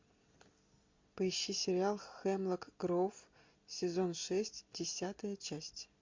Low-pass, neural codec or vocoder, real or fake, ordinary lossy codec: 7.2 kHz; none; real; MP3, 48 kbps